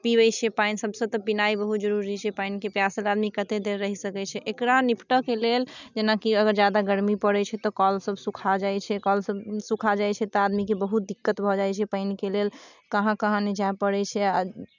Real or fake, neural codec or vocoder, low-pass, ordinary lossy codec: real; none; 7.2 kHz; none